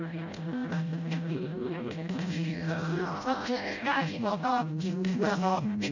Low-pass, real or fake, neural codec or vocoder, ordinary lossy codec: 7.2 kHz; fake; codec, 16 kHz, 0.5 kbps, FreqCodec, smaller model; none